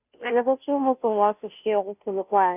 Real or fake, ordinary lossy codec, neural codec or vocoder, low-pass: fake; none; codec, 16 kHz, 0.5 kbps, FunCodec, trained on Chinese and English, 25 frames a second; 3.6 kHz